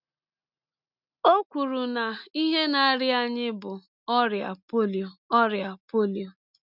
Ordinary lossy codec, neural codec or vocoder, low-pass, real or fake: none; none; 5.4 kHz; real